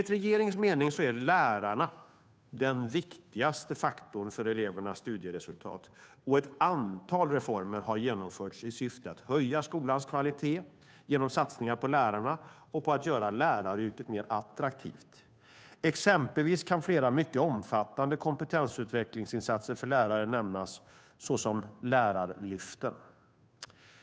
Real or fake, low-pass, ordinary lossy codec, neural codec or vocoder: fake; none; none; codec, 16 kHz, 2 kbps, FunCodec, trained on Chinese and English, 25 frames a second